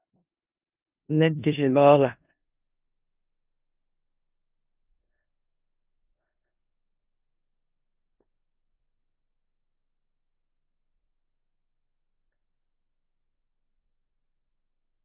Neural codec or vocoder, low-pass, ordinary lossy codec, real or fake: codec, 16 kHz in and 24 kHz out, 0.4 kbps, LongCat-Audio-Codec, four codebook decoder; 3.6 kHz; Opus, 24 kbps; fake